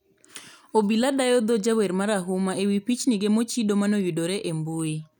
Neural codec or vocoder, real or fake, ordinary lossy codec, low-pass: none; real; none; none